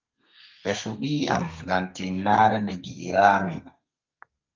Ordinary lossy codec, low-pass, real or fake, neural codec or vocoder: Opus, 24 kbps; 7.2 kHz; fake; codec, 32 kHz, 1.9 kbps, SNAC